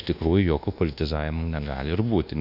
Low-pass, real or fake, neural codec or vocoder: 5.4 kHz; fake; codec, 24 kHz, 1.2 kbps, DualCodec